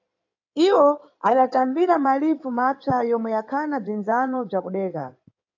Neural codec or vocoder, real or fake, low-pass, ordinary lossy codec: codec, 16 kHz in and 24 kHz out, 2.2 kbps, FireRedTTS-2 codec; fake; 7.2 kHz; AAC, 48 kbps